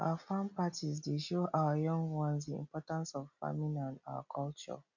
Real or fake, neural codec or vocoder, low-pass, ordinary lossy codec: real; none; 7.2 kHz; none